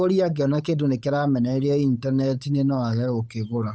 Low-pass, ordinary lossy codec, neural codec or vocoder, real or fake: none; none; codec, 16 kHz, 8 kbps, FunCodec, trained on Chinese and English, 25 frames a second; fake